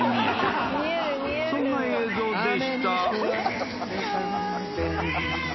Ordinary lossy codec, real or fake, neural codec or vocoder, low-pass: MP3, 24 kbps; real; none; 7.2 kHz